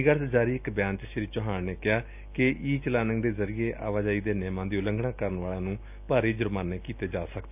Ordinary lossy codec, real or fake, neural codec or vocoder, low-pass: none; real; none; 3.6 kHz